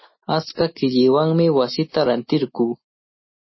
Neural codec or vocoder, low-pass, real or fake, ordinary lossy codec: none; 7.2 kHz; real; MP3, 24 kbps